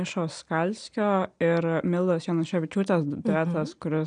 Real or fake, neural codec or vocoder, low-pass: real; none; 9.9 kHz